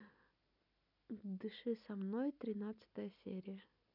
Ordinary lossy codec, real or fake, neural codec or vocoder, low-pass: none; real; none; 5.4 kHz